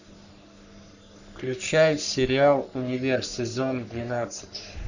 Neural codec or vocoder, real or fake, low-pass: codec, 44.1 kHz, 3.4 kbps, Pupu-Codec; fake; 7.2 kHz